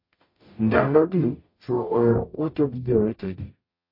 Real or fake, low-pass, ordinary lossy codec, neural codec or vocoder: fake; 5.4 kHz; MP3, 32 kbps; codec, 44.1 kHz, 0.9 kbps, DAC